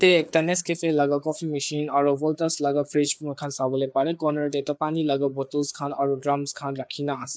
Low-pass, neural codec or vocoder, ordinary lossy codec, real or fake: none; codec, 16 kHz, 4 kbps, FreqCodec, larger model; none; fake